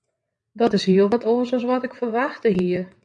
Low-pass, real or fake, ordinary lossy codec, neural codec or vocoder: 9.9 kHz; fake; MP3, 96 kbps; vocoder, 22.05 kHz, 80 mel bands, WaveNeXt